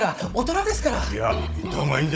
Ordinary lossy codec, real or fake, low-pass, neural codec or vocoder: none; fake; none; codec, 16 kHz, 16 kbps, FunCodec, trained on Chinese and English, 50 frames a second